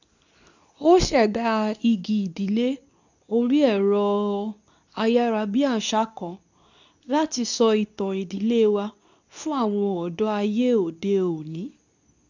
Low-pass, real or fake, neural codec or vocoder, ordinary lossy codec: 7.2 kHz; fake; codec, 24 kHz, 0.9 kbps, WavTokenizer, medium speech release version 2; none